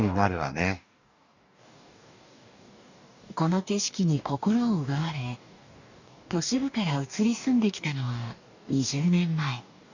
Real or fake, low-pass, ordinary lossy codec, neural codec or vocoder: fake; 7.2 kHz; none; codec, 44.1 kHz, 2.6 kbps, DAC